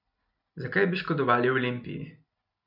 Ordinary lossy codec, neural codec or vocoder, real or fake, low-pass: none; none; real; 5.4 kHz